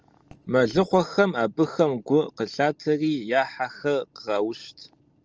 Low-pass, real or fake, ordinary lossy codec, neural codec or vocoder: 7.2 kHz; real; Opus, 24 kbps; none